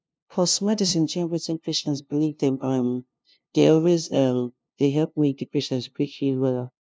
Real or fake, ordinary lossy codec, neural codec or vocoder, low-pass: fake; none; codec, 16 kHz, 0.5 kbps, FunCodec, trained on LibriTTS, 25 frames a second; none